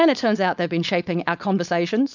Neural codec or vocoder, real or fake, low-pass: codec, 16 kHz, 4.8 kbps, FACodec; fake; 7.2 kHz